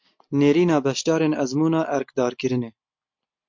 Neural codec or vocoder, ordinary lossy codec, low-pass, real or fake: none; MP3, 48 kbps; 7.2 kHz; real